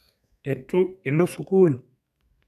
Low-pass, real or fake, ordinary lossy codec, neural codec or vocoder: 14.4 kHz; fake; none; codec, 32 kHz, 1.9 kbps, SNAC